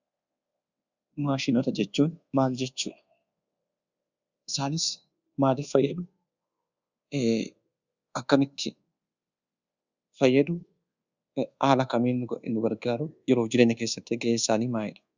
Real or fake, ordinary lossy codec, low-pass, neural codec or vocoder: fake; Opus, 64 kbps; 7.2 kHz; codec, 24 kHz, 1.2 kbps, DualCodec